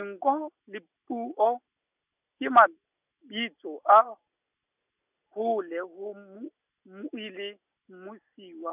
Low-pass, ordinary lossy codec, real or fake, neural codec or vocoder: 3.6 kHz; none; real; none